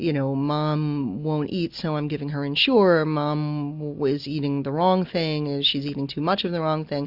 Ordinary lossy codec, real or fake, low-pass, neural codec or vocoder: AAC, 48 kbps; real; 5.4 kHz; none